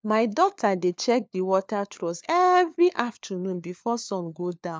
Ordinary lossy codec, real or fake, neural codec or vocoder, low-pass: none; fake; codec, 16 kHz, 4 kbps, FunCodec, trained on LibriTTS, 50 frames a second; none